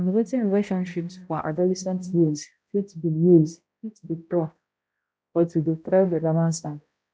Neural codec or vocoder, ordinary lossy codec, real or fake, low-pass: codec, 16 kHz, 0.5 kbps, X-Codec, HuBERT features, trained on balanced general audio; none; fake; none